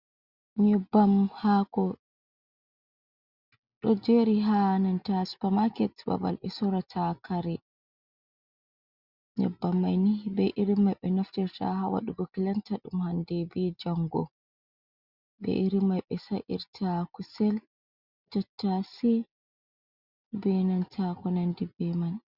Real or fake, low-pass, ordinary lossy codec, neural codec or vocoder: real; 5.4 kHz; Opus, 64 kbps; none